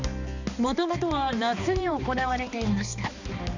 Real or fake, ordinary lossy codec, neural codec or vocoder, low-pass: fake; none; codec, 16 kHz, 2 kbps, X-Codec, HuBERT features, trained on balanced general audio; 7.2 kHz